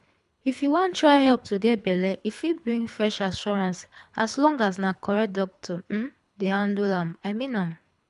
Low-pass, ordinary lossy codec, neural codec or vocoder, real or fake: 10.8 kHz; none; codec, 24 kHz, 3 kbps, HILCodec; fake